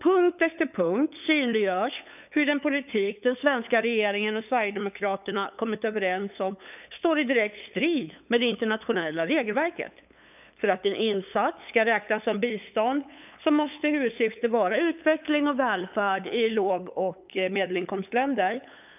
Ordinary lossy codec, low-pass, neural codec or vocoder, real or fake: none; 3.6 kHz; codec, 16 kHz, 8 kbps, FunCodec, trained on LibriTTS, 25 frames a second; fake